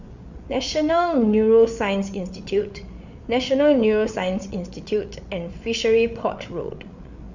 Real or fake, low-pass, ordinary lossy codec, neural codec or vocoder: fake; 7.2 kHz; none; codec, 16 kHz, 8 kbps, FreqCodec, larger model